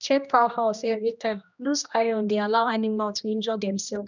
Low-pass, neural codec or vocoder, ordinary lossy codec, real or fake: 7.2 kHz; codec, 16 kHz, 1 kbps, X-Codec, HuBERT features, trained on general audio; none; fake